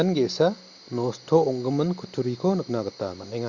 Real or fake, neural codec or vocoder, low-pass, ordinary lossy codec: real; none; 7.2 kHz; Opus, 64 kbps